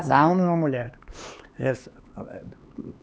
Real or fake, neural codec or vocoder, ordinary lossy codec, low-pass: fake; codec, 16 kHz, 2 kbps, X-Codec, HuBERT features, trained on LibriSpeech; none; none